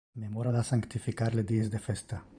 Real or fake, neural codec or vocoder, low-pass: fake; vocoder, 44.1 kHz, 128 mel bands every 512 samples, BigVGAN v2; 9.9 kHz